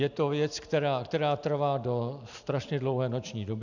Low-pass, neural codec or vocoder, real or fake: 7.2 kHz; none; real